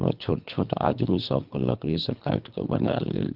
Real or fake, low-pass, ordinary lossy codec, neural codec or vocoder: fake; 5.4 kHz; Opus, 32 kbps; codec, 24 kHz, 3 kbps, HILCodec